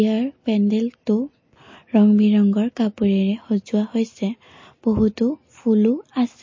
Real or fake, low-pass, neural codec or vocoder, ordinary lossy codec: real; 7.2 kHz; none; MP3, 32 kbps